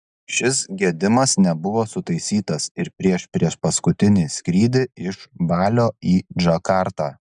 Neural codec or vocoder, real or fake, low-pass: none; real; 9.9 kHz